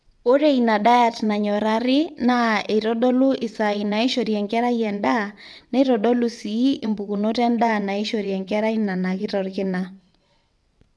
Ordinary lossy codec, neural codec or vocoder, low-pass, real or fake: none; vocoder, 22.05 kHz, 80 mel bands, WaveNeXt; none; fake